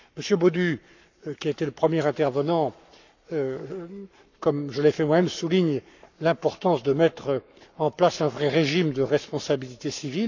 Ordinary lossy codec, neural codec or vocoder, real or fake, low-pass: none; codec, 44.1 kHz, 7.8 kbps, Pupu-Codec; fake; 7.2 kHz